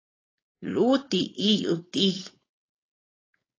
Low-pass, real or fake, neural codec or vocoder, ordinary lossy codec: 7.2 kHz; fake; codec, 16 kHz, 4.8 kbps, FACodec; AAC, 32 kbps